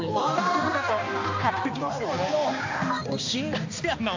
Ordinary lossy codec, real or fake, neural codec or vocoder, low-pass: AAC, 48 kbps; fake; codec, 16 kHz, 2 kbps, X-Codec, HuBERT features, trained on balanced general audio; 7.2 kHz